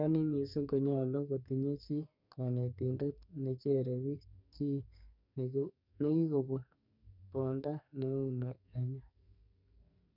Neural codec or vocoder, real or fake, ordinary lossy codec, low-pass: codec, 44.1 kHz, 2.6 kbps, SNAC; fake; none; 5.4 kHz